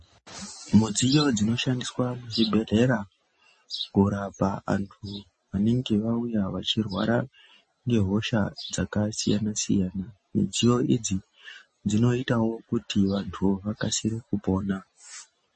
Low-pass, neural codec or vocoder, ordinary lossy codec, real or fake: 10.8 kHz; none; MP3, 32 kbps; real